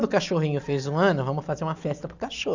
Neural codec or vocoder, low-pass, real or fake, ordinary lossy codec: none; 7.2 kHz; real; Opus, 64 kbps